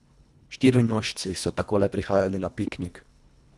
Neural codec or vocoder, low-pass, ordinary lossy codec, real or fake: codec, 24 kHz, 1.5 kbps, HILCodec; none; none; fake